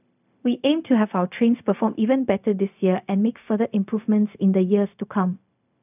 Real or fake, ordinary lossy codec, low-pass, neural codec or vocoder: fake; none; 3.6 kHz; codec, 16 kHz, 0.4 kbps, LongCat-Audio-Codec